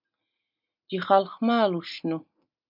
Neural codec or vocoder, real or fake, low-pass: none; real; 5.4 kHz